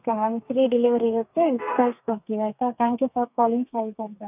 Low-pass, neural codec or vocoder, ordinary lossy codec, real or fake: 3.6 kHz; codec, 32 kHz, 1.9 kbps, SNAC; none; fake